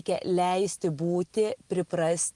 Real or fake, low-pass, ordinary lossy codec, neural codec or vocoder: real; 10.8 kHz; Opus, 32 kbps; none